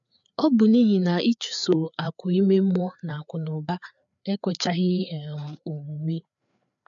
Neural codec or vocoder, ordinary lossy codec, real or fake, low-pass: codec, 16 kHz, 4 kbps, FreqCodec, larger model; none; fake; 7.2 kHz